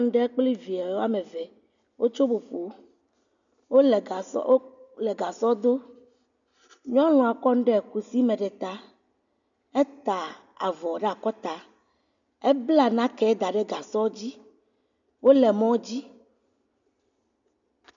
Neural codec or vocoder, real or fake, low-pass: none; real; 7.2 kHz